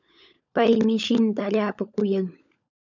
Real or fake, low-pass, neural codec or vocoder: fake; 7.2 kHz; codec, 16 kHz, 16 kbps, FunCodec, trained on LibriTTS, 50 frames a second